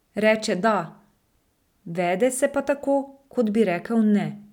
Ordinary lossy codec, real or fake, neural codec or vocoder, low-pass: none; real; none; 19.8 kHz